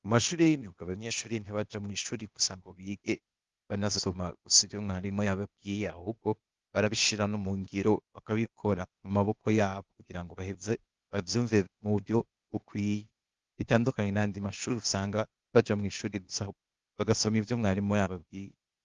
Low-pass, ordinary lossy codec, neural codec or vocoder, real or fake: 7.2 kHz; Opus, 32 kbps; codec, 16 kHz, 0.8 kbps, ZipCodec; fake